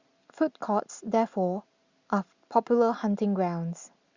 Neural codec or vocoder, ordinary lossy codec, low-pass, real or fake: none; Opus, 64 kbps; 7.2 kHz; real